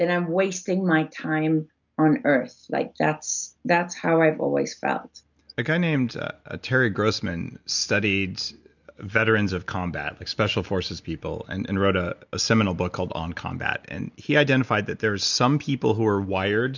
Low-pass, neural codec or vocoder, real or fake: 7.2 kHz; none; real